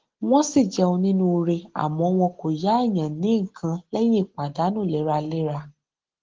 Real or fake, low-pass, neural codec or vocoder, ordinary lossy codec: real; 7.2 kHz; none; Opus, 16 kbps